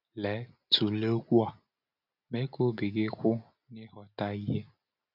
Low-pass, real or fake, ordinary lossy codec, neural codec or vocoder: 5.4 kHz; real; none; none